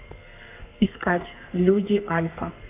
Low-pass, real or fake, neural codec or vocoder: 3.6 kHz; fake; codec, 32 kHz, 1.9 kbps, SNAC